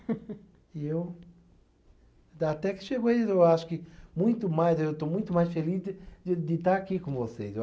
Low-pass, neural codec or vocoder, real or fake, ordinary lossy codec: none; none; real; none